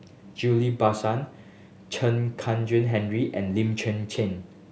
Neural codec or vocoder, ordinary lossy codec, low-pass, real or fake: none; none; none; real